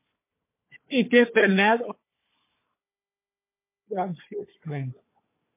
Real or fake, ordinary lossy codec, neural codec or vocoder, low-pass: fake; MP3, 24 kbps; codec, 16 kHz, 4 kbps, FunCodec, trained on Chinese and English, 50 frames a second; 3.6 kHz